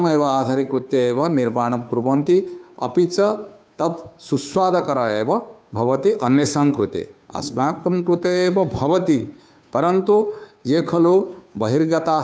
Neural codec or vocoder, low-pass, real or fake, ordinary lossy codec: codec, 16 kHz, 2 kbps, FunCodec, trained on Chinese and English, 25 frames a second; none; fake; none